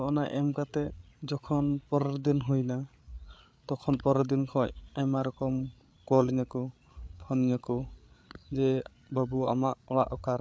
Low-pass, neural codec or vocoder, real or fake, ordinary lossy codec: none; codec, 16 kHz, 8 kbps, FreqCodec, larger model; fake; none